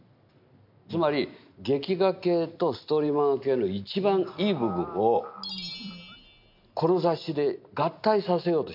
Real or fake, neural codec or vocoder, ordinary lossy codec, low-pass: real; none; MP3, 48 kbps; 5.4 kHz